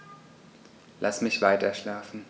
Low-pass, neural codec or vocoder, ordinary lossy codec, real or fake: none; none; none; real